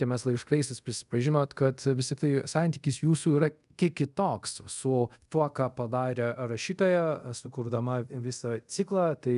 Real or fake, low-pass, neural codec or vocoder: fake; 10.8 kHz; codec, 24 kHz, 0.5 kbps, DualCodec